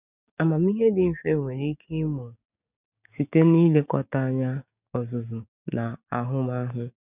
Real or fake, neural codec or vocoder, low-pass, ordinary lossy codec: fake; codec, 16 kHz, 6 kbps, DAC; 3.6 kHz; none